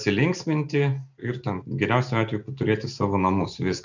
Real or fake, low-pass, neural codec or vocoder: real; 7.2 kHz; none